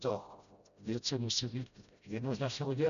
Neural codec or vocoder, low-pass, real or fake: codec, 16 kHz, 0.5 kbps, FreqCodec, smaller model; 7.2 kHz; fake